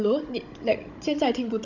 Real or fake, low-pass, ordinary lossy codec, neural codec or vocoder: fake; 7.2 kHz; none; codec, 16 kHz, 16 kbps, FunCodec, trained on Chinese and English, 50 frames a second